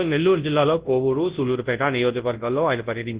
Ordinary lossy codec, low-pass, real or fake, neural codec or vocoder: Opus, 16 kbps; 3.6 kHz; fake; codec, 24 kHz, 0.9 kbps, WavTokenizer, large speech release